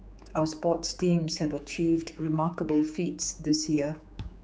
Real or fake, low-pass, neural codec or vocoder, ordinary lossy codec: fake; none; codec, 16 kHz, 4 kbps, X-Codec, HuBERT features, trained on general audio; none